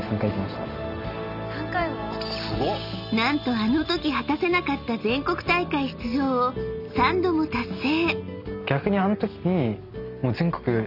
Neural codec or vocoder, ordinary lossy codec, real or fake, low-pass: none; none; real; 5.4 kHz